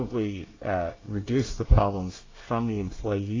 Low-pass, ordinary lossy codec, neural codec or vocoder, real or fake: 7.2 kHz; AAC, 32 kbps; codec, 24 kHz, 1 kbps, SNAC; fake